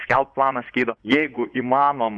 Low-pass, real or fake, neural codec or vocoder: 9.9 kHz; fake; vocoder, 24 kHz, 100 mel bands, Vocos